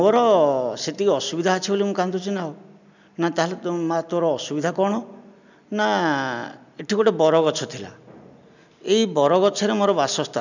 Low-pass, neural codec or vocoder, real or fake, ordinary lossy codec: 7.2 kHz; none; real; none